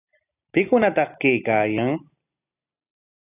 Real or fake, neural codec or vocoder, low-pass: real; none; 3.6 kHz